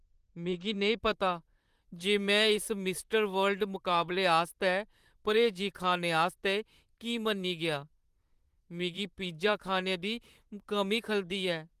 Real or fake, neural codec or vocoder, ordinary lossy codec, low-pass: fake; vocoder, 44.1 kHz, 128 mel bands, Pupu-Vocoder; Opus, 24 kbps; 14.4 kHz